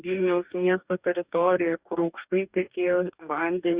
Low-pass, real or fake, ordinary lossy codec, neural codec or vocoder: 3.6 kHz; fake; Opus, 64 kbps; codec, 44.1 kHz, 2.6 kbps, DAC